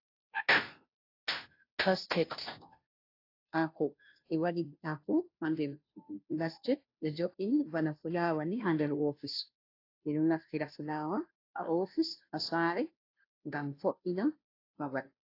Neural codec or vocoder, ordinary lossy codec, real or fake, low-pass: codec, 16 kHz, 0.5 kbps, FunCodec, trained on Chinese and English, 25 frames a second; AAC, 32 kbps; fake; 5.4 kHz